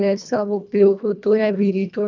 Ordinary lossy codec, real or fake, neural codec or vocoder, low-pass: none; fake; codec, 24 kHz, 1.5 kbps, HILCodec; 7.2 kHz